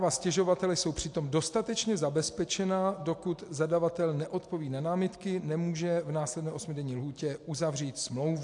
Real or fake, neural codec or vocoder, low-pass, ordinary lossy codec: real; none; 10.8 kHz; AAC, 64 kbps